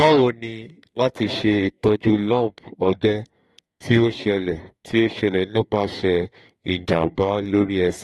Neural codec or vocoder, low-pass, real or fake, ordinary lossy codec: codec, 32 kHz, 1.9 kbps, SNAC; 14.4 kHz; fake; AAC, 32 kbps